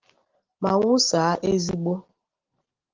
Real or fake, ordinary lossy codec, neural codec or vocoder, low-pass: real; Opus, 16 kbps; none; 7.2 kHz